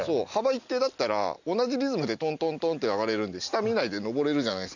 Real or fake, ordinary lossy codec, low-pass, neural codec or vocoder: real; none; 7.2 kHz; none